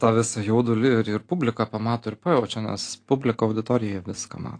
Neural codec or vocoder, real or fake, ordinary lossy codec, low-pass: none; real; AAC, 48 kbps; 9.9 kHz